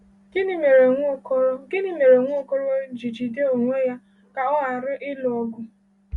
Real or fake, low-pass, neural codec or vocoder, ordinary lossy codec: real; 10.8 kHz; none; Opus, 64 kbps